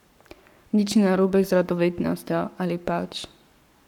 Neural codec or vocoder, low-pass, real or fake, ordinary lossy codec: codec, 44.1 kHz, 7.8 kbps, Pupu-Codec; 19.8 kHz; fake; none